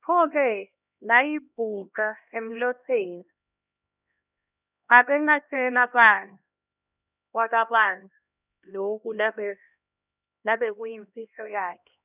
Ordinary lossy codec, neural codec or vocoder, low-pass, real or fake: none; codec, 16 kHz, 1 kbps, X-Codec, HuBERT features, trained on LibriSpeech; 3.6 kHz; fake